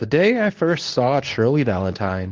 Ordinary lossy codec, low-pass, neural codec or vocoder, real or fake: Opus, 24 kbps; 7.2 kHz; codec, 24 kHz, 0.9 kbps, WavTokenizer, medium speech release version 2; fake